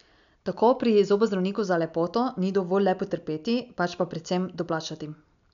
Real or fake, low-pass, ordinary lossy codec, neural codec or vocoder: real; 7.2 kHz; none; none